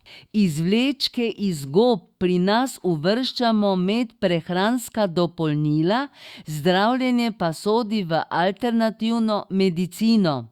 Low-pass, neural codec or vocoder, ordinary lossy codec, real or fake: 19.8 kHz; autoencoder, 48 kHz, 128 numbers a frame, DAC-VAE, trained on Japanese speech; Opus, 64 kbps; fake